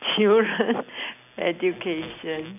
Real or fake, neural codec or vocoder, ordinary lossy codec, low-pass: real; none; none; 3.6 kHz